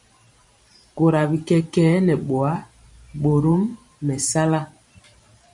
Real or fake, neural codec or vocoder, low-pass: fake; vocoder, 44.1 kHz, 128 mel bands every 512 samples, BigVGAN v2; 10.8 kHz